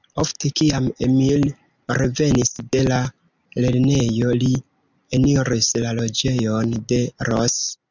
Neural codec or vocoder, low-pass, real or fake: none; 7.2 kHz; real